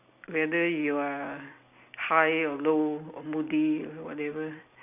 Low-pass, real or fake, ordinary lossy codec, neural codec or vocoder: 3.6 kHz; real; none; none